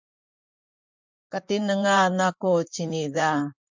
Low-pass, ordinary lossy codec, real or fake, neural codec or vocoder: 7.2 kHz; MP3, 64 kbps; fake; vocoder, 44.1 kHz, 128 mel bands, Pupu-Vocoder